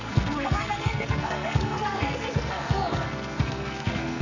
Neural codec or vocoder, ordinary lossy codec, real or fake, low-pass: codec, 44.1 kHz, 2.6 kbps, SNAC; none; fake; 7.2 kHz